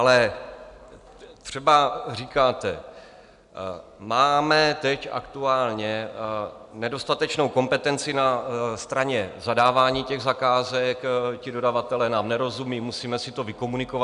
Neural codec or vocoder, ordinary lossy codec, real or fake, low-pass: none; MP3, 96 kbps; real; 10.8 kHz